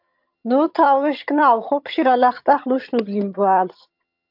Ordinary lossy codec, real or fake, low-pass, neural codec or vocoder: MP3, 48 kbps; fake; 5.4 kHz; vocoder, 22.05 kHz, 80 mel bands, HiFi-GAN